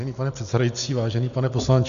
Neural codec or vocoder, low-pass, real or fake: none; 7.2 kHz; real